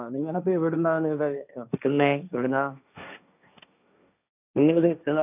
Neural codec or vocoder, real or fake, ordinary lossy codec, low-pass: codec, 16 kHz, 1.1 kbps, Voila-Tokenizer; fake; none; 3.6 kHz